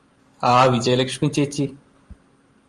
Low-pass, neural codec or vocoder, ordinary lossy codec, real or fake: 10.8 kHz; vocoder, 44.1 kHz, 128 mel bands every 512 samples, BigVGAN v2; Opus, 32 kbps; fake